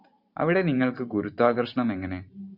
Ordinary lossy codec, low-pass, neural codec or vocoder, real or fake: Opus, 64 kbps; 5.4 kHz; vocoder, 44.1 kHz, 128 mel bands every 512 samples, BigVGAN v2; fake